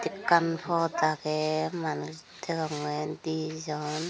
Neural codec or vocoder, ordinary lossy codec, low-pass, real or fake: none; none; none; real